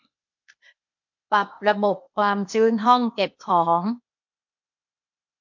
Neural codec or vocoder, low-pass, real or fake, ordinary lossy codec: codec, 16 kHz, 0.8 kbps, ZipCodec; 7.2 kHz; fake; MP3, 48 kbps